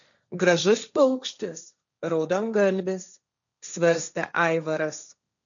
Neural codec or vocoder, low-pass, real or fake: codec, 16 kHz, 1.1 kbps, Voila-Tokenizer; 7.2 kHz; fake